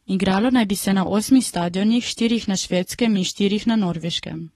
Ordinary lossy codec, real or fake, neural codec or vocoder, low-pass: AAC, 32 kbps; fake; codec, 44.1 kHz, 7.8 kbps, Pupu-Codec; 19.8 kHz